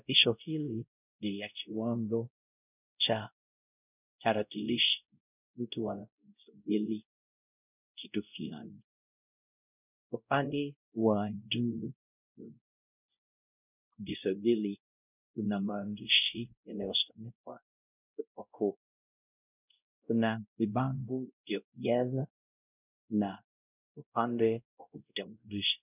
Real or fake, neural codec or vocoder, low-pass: fake; codec, 16 kHz, 0.5 kbps, X-Codec, WavLM features, trained on Multilingual LibriSpeech; 3.6 kHz